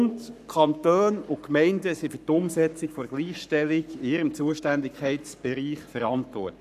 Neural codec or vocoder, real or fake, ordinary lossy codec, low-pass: codec, 44.1 kHz, 7.8 kbps, Pupu-Codec; fake; none; 14.4 kHz